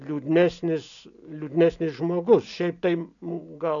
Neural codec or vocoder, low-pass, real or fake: none; 7.2 kHz; real